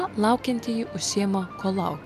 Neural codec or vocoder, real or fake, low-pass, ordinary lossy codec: none; real; 14.4 kHz; MP3, 96 kbps